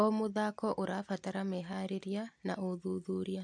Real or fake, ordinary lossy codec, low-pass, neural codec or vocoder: real; MP3, 64 kbps; 9.9 kHz; none